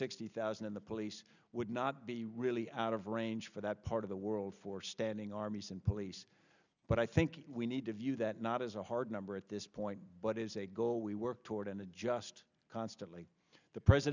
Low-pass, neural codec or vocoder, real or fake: 7.2 kHz; none; real